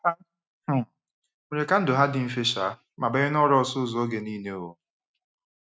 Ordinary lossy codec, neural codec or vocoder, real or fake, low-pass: none; none; real; none